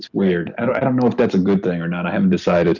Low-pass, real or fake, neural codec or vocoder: 7.2 kHz; real; none